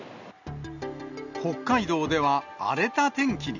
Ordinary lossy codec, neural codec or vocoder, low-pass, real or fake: none; none; 7.2 kHz; real